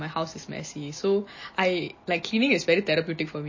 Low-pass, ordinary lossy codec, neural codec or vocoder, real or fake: 7.2 kHz; MP3, 32 kbps; none; real